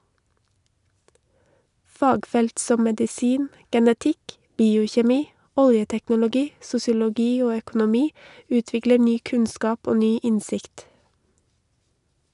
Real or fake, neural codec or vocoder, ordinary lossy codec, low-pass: real; none; none; 10.8 kHz